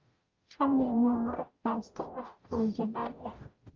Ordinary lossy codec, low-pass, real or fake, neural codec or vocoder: Opus, 24 kbps; 7.2 kHz; fake; codec, 44.1 kHz, 0.9 kbps, DAC